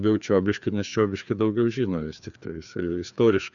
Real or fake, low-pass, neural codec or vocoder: fake; 7.2 kHz; codec, 16 kHz, 2 kbps, FreqCodec, larger model